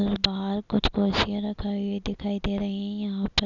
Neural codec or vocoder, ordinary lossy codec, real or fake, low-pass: none; none; real; 7.2 kHz